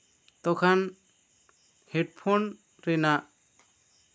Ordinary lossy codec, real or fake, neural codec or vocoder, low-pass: none; real; none; none